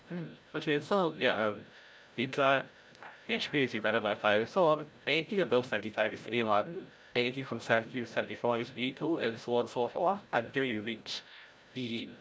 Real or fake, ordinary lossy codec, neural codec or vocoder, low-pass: fake; none; codec, 16 kHz, 0.5 kbps, FreqCodec, larger model; none